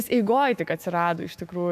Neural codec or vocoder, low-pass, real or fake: autoencoder, 48 kHz, 128 numbers a frame, DAC-VAE, trained on Japanese speech; 14.4 kHz; fake